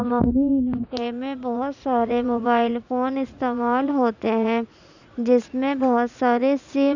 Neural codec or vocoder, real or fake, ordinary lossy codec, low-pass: vocoder, 44.1 kHz, 80 mel bands, Vocos; fake; none; 7.2 kHz